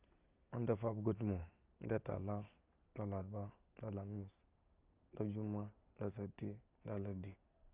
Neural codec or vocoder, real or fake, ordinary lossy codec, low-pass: none; real; Opus, 24 kbps; 3.6 kHz